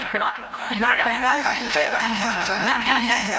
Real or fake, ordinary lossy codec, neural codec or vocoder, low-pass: fake; none; codec, 16 kHz, 0.5 kbps, FreqCodec, larger model; none